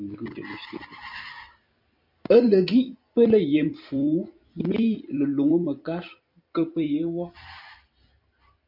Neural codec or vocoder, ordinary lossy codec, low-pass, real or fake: vocoder, 44.1 kHz, 128 mel bands every 256 samples, BigVGAN v2; MP3, 48 kbps; 5.4 kHz; fake